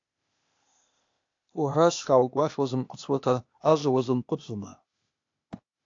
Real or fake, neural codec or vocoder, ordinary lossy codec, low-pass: fake; codec, 16 kHz, 0.8 kbps, ZipCodec; AAC, 48 kbps; 7.2 kHz